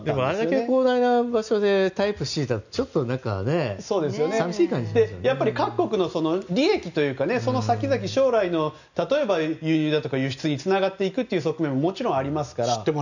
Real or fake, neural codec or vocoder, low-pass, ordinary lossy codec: real; none; 7.2 kHz; none